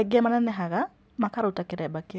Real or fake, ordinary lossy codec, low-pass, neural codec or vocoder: real; none; none; none